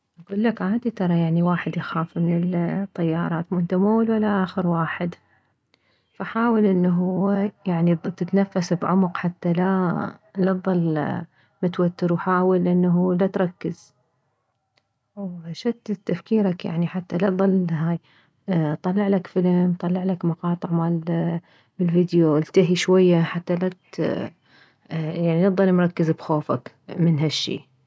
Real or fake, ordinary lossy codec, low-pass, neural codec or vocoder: real; none; none; none